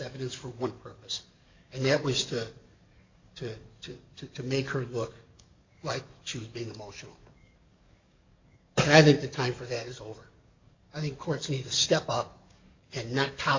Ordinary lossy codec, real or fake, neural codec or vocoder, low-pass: MP3, 64 kbps; fake; codec, 44.1 kHz, 7.8 kbps, DAC; 7.2 kHz